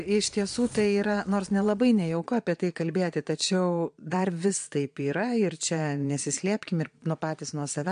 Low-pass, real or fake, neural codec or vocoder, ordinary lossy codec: 9.9 kHz; fake; vocoder, 22.05 kHz, 80 mel bands, Vocos; MP3, 64 kbps